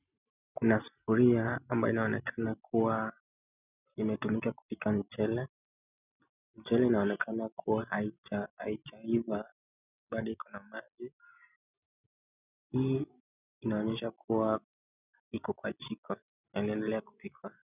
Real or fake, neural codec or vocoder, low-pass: real; none; 3.6 kHz